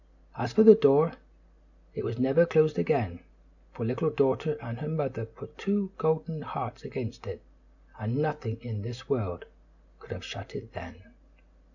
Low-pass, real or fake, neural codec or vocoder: 7.2 kHz; real; none